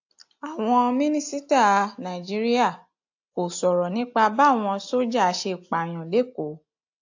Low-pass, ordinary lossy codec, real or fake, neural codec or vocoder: 7.2 kHz; AAC, 48 kbps; real; none